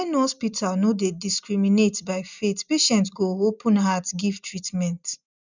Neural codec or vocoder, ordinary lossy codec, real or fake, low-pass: none; none; real; 7.2 kHz